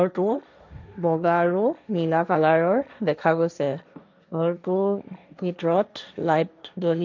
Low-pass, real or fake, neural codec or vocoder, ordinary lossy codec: 7.2 kHz; fake; codec, 16 kHz, 1.1 kbps, Voila-Tokenizer; none